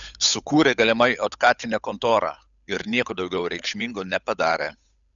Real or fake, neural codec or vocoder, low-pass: fake; codec, 16 kHz, 8 kbps, FunCodec, trained on Chinese and English, 25 frames a second; 7.2 kHz